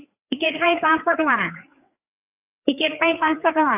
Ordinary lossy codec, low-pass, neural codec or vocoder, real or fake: AAC, 32 kbps; 3.6 kHz; codec, 16 kHz, 4 kbps, FreqCodec, larger model; fake